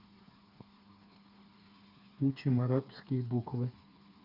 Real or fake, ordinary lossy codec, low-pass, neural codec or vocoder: fake; none; 5.4 kHz; codec, 16 kHz, 4 kbps, FreqCodec, smaller model